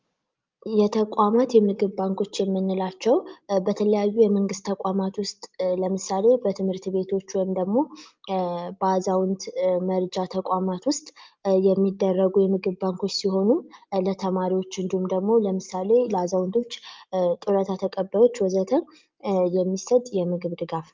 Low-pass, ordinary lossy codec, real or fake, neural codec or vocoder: 7.2 kHz; Opus, 24 kbps; real; none